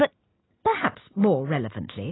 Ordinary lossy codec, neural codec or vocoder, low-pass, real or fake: AAC, 16 kbps; none; 7.2 kHz; real